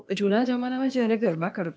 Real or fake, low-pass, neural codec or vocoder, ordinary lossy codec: fake; none; codec, 16 kHz, 0.8 kbps, ZipCodec; none